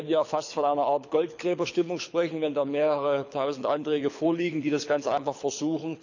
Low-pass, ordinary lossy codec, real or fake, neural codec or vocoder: 7.2 kHz; none; fake; codec, 24 kHz, 6 kbps, HILCodec